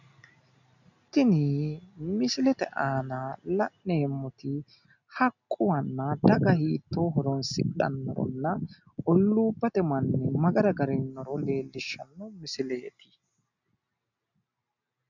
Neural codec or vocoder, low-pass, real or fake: none; 7.2 kHz; real